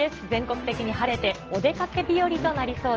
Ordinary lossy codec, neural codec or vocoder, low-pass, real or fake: Opus, 16 kbps; none; 7.2 kHz; real